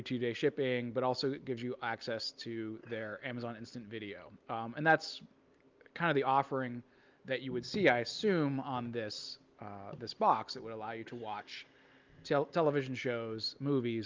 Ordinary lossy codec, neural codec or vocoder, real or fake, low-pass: Opus, 24 kbps; none; real; 7.2 kHz